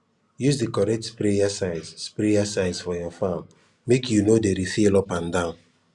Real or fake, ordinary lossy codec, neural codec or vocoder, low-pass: real; Opus, 64 kbps; none; 10.8 kHz